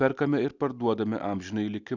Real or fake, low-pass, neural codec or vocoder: real; 7.2 kHz; none